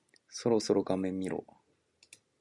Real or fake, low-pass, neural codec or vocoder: real; 10.8 kHz; none